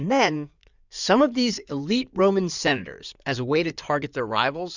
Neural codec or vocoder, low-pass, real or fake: codec, 16 kHz in and 24 kHz out, 2.2 kbps, FireRedTTS-2 codec; 7.2 kHz; fake